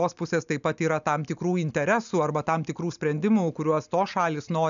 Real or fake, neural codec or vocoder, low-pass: real; none; 7.2 kHz